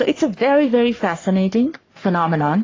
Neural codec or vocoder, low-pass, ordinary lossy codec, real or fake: codec, 44.1 kHz, 3.4 kbps, Pupu-Codec; 7.2 kHz; AAC, 32 kbps; fake